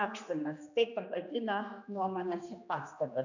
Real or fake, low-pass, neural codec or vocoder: fake; 7.2 kHz; codec, 16 kHz, 2 kbps, X-Codec, HuBERT features, trained on general audio